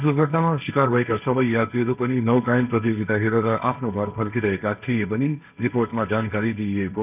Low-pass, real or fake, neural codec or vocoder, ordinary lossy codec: 3.6 kHz; fake; codec, 16 kHz, 1.1 kbps, Voila-Tokenizer; none